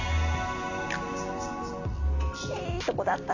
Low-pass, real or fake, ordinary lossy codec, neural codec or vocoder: 7.2 kHz; real; none; none